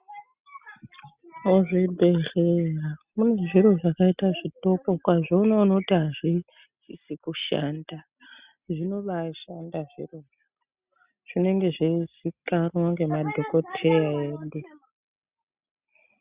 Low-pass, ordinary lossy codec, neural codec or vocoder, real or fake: 3.6 kHz; Opus, 64 kbps; none; real